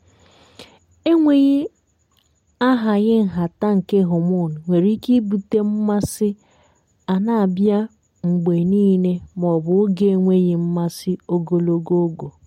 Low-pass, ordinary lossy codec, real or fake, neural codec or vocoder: 19.8 kHz; MP3, 64 kbps; real; none